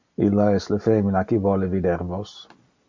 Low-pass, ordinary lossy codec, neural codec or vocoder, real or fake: 7.2 kHz; MP3, 48 kbps; none; real